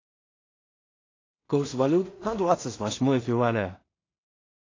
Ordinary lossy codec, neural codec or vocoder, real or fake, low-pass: AAC, 32 kbps; codec, 16 kHz in and 24 kHz out, 0.4 kbps, LongCat-Audio-Codec, two codebook decoder; fake; 7.2 kHz